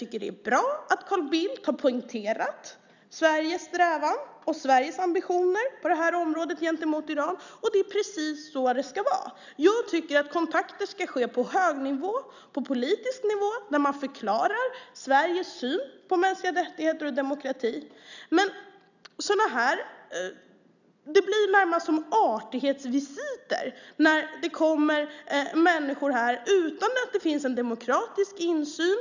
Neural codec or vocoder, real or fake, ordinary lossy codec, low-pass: none; real; none; 7.2 kHz